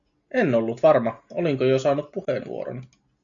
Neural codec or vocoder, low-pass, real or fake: none; 7.2 kHz; real